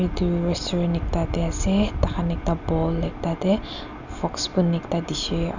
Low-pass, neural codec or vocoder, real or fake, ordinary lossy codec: 7.2 kHz; none; real; none